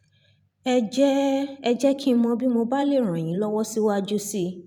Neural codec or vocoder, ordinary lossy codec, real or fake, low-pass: vocoder, 44.1 kHz, 128 mel bands every 512 samples, BigVGAN v2; none; fake; 19.8 kHz